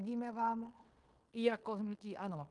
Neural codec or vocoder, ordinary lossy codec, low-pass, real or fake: codec, 16 kHz in and 24 kHz out, 0.9 kbps, LongCat-Audio-Codec, fine tuned four codebook decoder; Opus, 32 kbps; 10.8 kHz; fake